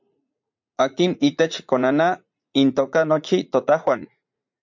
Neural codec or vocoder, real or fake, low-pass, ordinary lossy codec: vocoder, 44.1 kHz, 80 mel bands, Vocos; fake; 7.2 kHz; MP3, 64 kbps